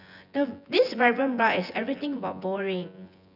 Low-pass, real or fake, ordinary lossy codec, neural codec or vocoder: 5.4 kHz; fake; none; vocoder, 24 kHz, 100 mel bands, Vocos